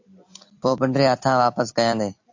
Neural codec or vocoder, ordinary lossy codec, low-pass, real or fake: vocoder, 44.1 kHz, 128 mel bands every 256 samples, BigVGAN v2; AAC, 48 kbps; 7.2 kHz; fake